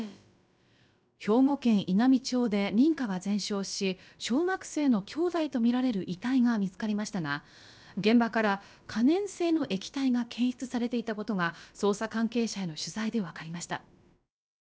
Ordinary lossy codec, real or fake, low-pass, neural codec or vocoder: none; fake; none; codec, 16 kHz, about 1 kbps, DyCAST, with the encoder's durations